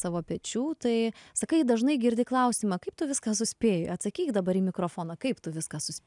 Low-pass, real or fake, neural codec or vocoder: 10.8 kHz; real; none